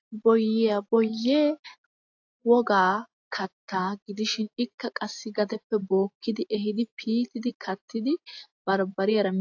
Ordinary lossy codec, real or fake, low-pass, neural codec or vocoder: AAC, 48 kbps; real; 7.2 kHz; none